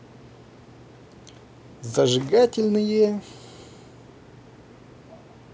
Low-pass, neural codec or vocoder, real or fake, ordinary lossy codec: none; none; real; none